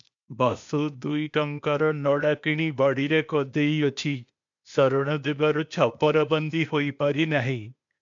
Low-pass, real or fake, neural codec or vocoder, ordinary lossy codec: 7.2 kHz; fake; codec, 16 kHz, 0.8 kbps, ZipCodec; MP3, 64 kbps